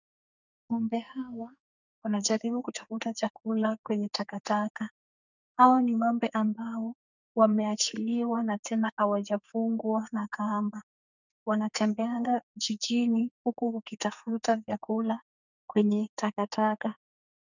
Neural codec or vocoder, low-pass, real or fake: codec, 44.1 kHz, 2.6 kbps, SNAC; 7.2 kHz; fake